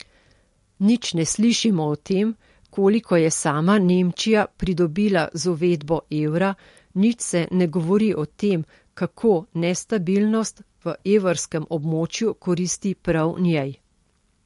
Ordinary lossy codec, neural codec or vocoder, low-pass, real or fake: MP3, 48 kbps; none; 14.4 kHz; real